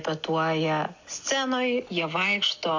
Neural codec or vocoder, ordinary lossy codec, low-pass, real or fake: none; AAC, 48 kbps; 7.2 kHz; real